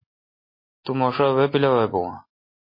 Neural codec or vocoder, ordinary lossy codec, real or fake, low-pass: none; MP3, 24 kbps; real; 5.4 kHz